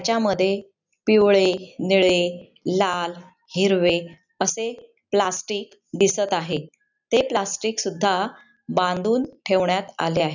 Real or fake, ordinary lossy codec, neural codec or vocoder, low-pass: real; none; none; 7.2 kHz